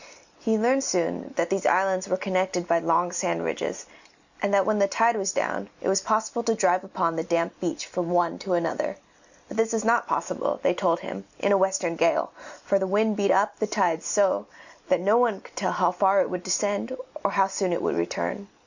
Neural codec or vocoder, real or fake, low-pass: none; real; 7.2 kHz